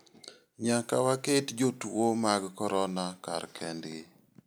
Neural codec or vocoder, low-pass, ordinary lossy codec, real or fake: none; none; none; real